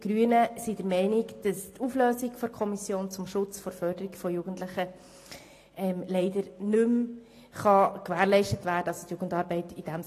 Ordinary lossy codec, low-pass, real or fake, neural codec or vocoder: AAC, 48 kbps; 14.4 kHz; real; none